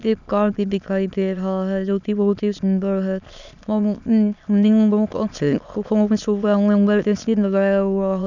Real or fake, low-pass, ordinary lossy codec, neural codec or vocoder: fake; 7.2 kHz; none; autoencoder, 22.05 kHz, a latent of 192 numbers a frame, VITS, trained on many speakers